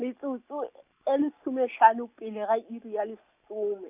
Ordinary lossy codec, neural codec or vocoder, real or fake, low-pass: none; none; real; 3.6 kHz